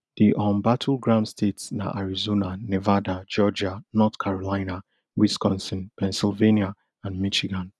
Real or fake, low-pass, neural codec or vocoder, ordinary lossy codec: real; none; none; none